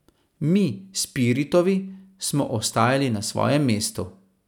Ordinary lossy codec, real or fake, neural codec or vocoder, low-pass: none; real; none; 19.8 kHz